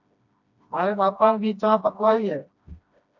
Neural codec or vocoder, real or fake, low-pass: codec, 16 kHz, 1 kbps, FreqCodec, smaller model; fake; 7.2 kHz